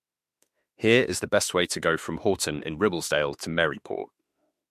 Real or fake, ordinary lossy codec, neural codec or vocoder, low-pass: fake; MP3, 64 kbps; autoencoder, 48 kHz, 32 numbers a frame, DAC-VAE, trained on Japanese speech; 14.4 kHz